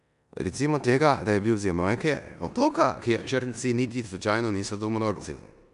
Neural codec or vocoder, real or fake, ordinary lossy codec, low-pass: codec, 16 kHz in and 24 kHz out, 0.9 kbps, LongCat-Audio-Codec, four codebook decoder; fake; none; 10.8 kHz